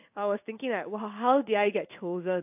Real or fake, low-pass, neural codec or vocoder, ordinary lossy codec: fake; 3.6 kHz; codec, 16 kHz in and 24 kHz out, 1 kbps, XY-Tokenizer; AAC, 32 kbps